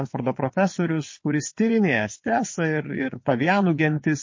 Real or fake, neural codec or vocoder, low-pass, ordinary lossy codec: fake; vocoder, 22.05 kHz, 80 mel bands, WaveNeXt; 7.2 kHz; MP3, 32 kbps